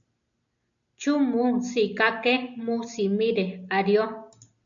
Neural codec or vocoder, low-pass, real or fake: none; 7.2 kHz; real